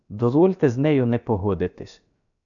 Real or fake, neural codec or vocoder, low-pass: fake; codec, 16 kHz, about 1 kbps, DyCAST, with the encoder's durations; 7.2 kHz